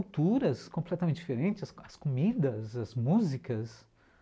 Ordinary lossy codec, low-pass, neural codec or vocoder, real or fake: none; none; none; real